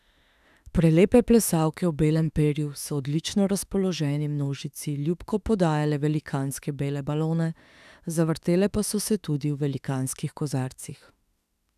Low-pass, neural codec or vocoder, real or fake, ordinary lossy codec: 14.4 kHz; autoencoder, 48 kHz, 32 numbers a frame, DAC-VAE, trained on Japanese speech; fake; none